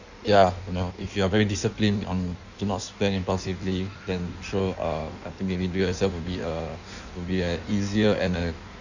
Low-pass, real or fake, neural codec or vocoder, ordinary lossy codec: 7.2 kHz; fake; codec, 16 kHz in and 24 kHz out, 1.1 kbps, FireRedTTS-2 codec; none